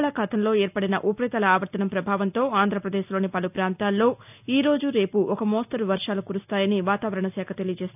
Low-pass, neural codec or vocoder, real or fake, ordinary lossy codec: 3.6 kHz; none; real; none